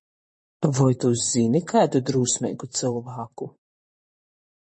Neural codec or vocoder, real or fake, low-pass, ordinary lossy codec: vocoder, 44.1 kHz, 128 mel bands, Pupu-Vocoder; fake; 10.8 kHz; MP3, 32 kbps